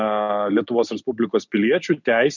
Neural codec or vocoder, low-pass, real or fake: none; 7.2 kHz; real